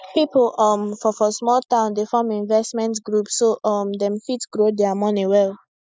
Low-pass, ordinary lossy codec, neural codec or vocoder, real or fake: none; none; none; real